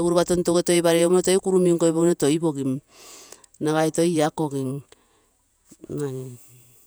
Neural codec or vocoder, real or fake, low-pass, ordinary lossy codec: vocoder, 48 kHz, 128 mel bands, Vocos; fake; none; none